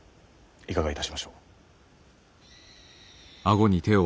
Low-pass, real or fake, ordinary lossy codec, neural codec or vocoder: none; real; none; none